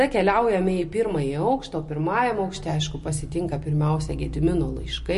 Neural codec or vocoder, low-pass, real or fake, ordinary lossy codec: none; 14.4 kHz; real; MP3, 48 kbps